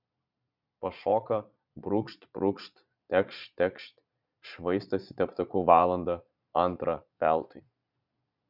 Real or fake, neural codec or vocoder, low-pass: fake; vocoder, 22.05 kHz, 80 mel bands, Vocos; 5.4 kHz